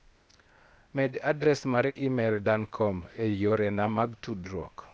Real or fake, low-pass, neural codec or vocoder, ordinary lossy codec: fake; none; codec, 16 kHz, 0.8 kbps, ZipCodec; none